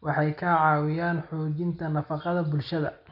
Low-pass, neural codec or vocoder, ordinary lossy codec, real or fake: 5.4 kHz; none; none; real